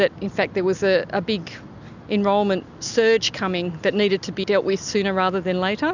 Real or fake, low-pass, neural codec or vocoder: real; 7.2 kHz; none